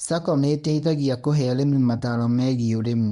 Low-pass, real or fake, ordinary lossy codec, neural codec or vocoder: 10.8 kHz; fake; none; codec, 24 kHz, 0.9 kbps, WavTokenizer, medium speech release version 1